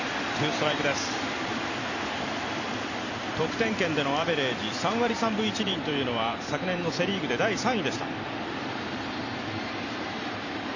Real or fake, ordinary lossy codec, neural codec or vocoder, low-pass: fake; none; vocoder, 44.1 kHz, 128 mel bands every 256 samples, BigVGAN v2; 7.2 kHz